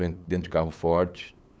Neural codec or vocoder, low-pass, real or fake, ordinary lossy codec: codec, 16 kHz, 8 kbps, FunCodec, trained on LibriTTS, 25 frames a second; none; fake; none